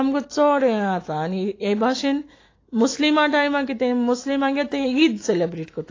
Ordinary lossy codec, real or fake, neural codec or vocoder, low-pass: AAC, 32 kbps; fake; autoencoder, 48 kHz, 128 numbers a frame, DAC-VAE, trained on Japanese speech; 7.2 kHz